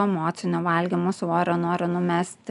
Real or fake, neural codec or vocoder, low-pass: real; none; 10.8 kHz